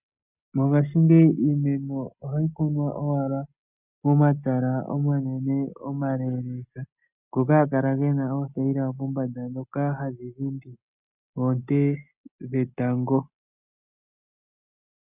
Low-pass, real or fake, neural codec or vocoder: 3.6 kHz; real; none